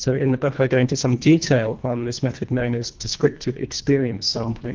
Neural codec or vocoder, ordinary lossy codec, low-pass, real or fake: codec, 24 kHz, 1.5 kbps, HILCodec; Opus, 32 kbps; 7.2 kHz; fake